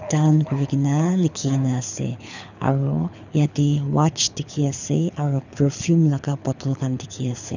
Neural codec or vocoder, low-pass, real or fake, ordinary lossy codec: codec, 24 kHz, 6 kbps, HILCodec; 7.2 kHz; fake; none